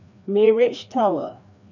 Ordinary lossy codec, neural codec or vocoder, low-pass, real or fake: none; codec, 16 kHz, 2 kbps, FreqCodec, larger model; 7.2 kHz; fake